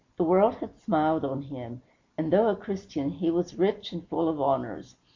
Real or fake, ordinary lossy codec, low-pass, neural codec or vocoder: real; MP3, 48 kbps; 7.2 kHz; none